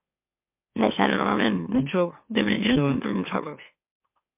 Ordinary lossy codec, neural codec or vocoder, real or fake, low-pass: MP3, 32 kbps; autoencoder, 44.1 kHz, a latent of 192 numbers a frame, MeloTTS; fake; 3.6 kHz